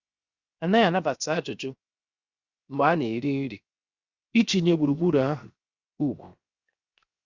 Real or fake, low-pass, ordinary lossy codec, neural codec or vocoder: fake; 7.2 kHz; Opus, 64 kbps; codec, 16 kHz, 0.7 kbps, FocalCodec